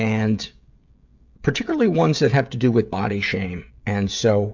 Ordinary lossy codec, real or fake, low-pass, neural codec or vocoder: MP3, 64 kbps; fake; 7.2 kHz; codec, 16 kHz, 16 kbps, FreqCodec, smaller model